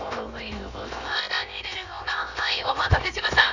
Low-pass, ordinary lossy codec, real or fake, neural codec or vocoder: 7.2 kHz; none; fake; codec, 16 kHz, 0.7 kbps, FocalCodec